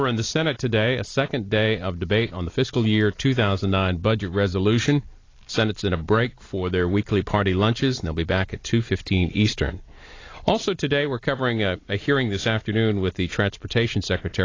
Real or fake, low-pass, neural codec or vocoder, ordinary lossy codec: real; 7.2 kHz; none; AAC, 32 kbps